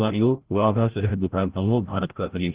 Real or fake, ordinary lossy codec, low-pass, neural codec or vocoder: fake; Opus, 16 kbps; 3.6 kHz; codec, 16 kHz, 0.5 kbps, FreqCodec, larger model